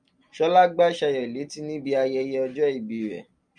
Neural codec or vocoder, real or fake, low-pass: none; real; 9.9 kHz